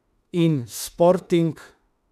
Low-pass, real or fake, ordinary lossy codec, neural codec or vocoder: 14.4 kHz; fake; none; autoencoder, 48 kHz, 32 numbers a frame, DAC-VAE, trained on Japanese speech